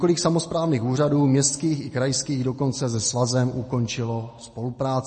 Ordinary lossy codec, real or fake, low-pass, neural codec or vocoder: MP3, 32 kbps; real; 10.8 kHz; none